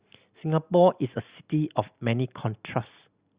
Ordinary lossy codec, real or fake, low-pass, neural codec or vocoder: Opus, 64 kbps; real; 3.6 kHz; none